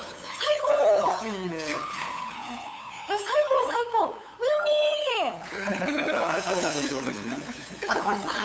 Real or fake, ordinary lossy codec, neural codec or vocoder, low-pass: fake; none; codec, 16 kHz, 8 kbps, FunCodec, trained on LibriTTS, 25 frames a second; none